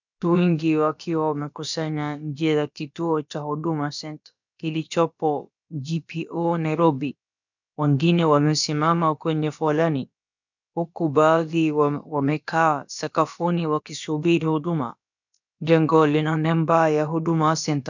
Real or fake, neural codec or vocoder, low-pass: fake; codec, 16 kHz, about 1 kbps, DyCAST, with the encoder's durations; 7.2 kHz